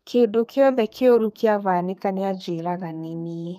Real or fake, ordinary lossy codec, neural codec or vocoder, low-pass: fake; none; codec, 32 kHz, 1.9 kbps, SNAC; 14.4 kHz